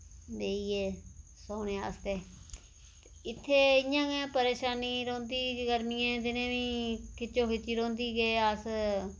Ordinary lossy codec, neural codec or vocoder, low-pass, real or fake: none; none; none; real